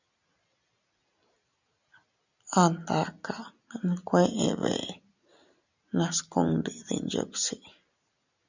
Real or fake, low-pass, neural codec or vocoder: real; 7.2 kHz; none